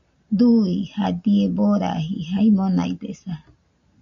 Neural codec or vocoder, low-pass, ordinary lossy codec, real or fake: none; 7.2 kHz; AAC, 64 kbps; real